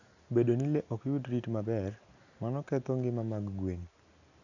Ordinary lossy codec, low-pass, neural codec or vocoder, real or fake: none; 7.2 kHz; none; real